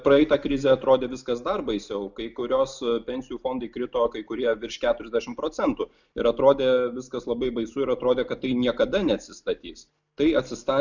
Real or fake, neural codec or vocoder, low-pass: real; none; 7.2 kHz